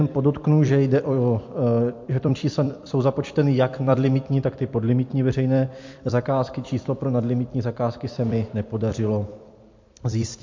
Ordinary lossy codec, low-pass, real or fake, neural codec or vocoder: MP3, 48 kbps; 7.2 kHz; fake; vocoder, 44.1 kHz, 128 mel bands every 256 samples, BigVGAN v2